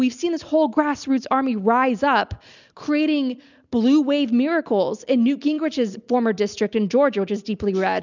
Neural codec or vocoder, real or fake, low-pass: none; real; 7.2 kHz